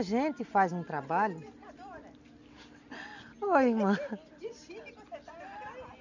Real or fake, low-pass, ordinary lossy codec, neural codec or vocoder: real; 7.2 kHz; none; none